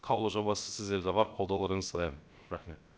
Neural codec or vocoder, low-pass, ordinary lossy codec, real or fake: codec, 16 kHz, about 1 kbps, DyCAST, with the encoder's durations; none; none; fake